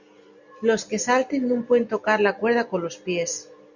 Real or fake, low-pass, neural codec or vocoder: real; 7.2 kHz; none